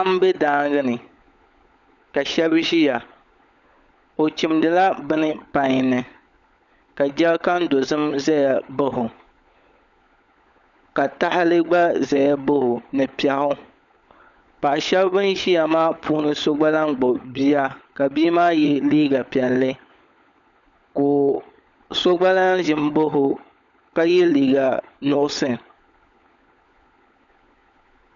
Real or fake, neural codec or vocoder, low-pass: fake; codec, 16 kHz, 16 kbps, FunCodec, trained on LibriTTS, 50 frames a second; 7.2 kHz